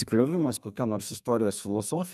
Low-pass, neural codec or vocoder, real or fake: 14.4 kHz; codec, 32 kHz, 1.9 kbps, SNAC; fake